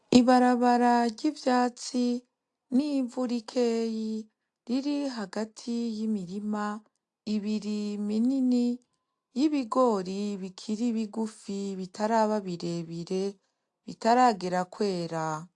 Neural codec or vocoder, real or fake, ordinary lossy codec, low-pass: none; real; AAC, 64 kbps; 10.8 kHz